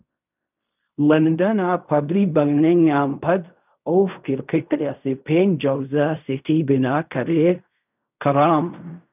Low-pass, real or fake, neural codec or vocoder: 3.6 kHz; fake; codec, 16 kHz in and 24 kHz out, 0.4 kbps, LongCat-Audio-Codec, fine tuned four codebook decoder